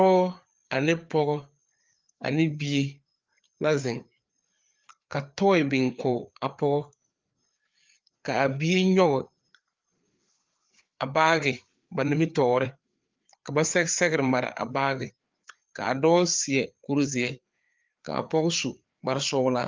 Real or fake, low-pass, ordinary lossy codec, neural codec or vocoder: fake; 7.2 kHz; Opus, 32 kbps; codec, 16 kHz, 4 kbps, FreqCodec, larger model